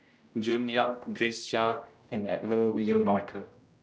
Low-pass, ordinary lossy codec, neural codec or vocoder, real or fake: none; none; codec, 16 kHz, 0.5 kbps, X-Codec, HuBERT features, trained on general audio; fake